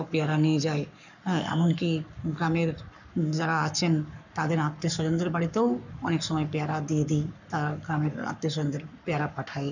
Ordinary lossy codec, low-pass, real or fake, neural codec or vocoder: none; 7.2 kHz; fake; codec, 44.1 kHz, 7.8 kbps, Pupu-Codec